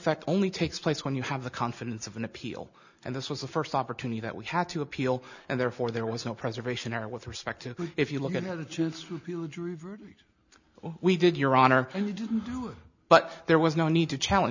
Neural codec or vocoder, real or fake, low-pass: none; real; 7.2 kHz